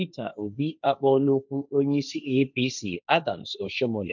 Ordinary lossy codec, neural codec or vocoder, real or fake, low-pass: none; codec, 16 kHz, 1.1 kbps, Voila-Tokenizer; fake; 7.2 kHz